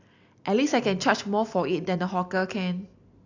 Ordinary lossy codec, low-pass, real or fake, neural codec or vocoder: AAC, 48 kbps; 7.2 kHz; real; none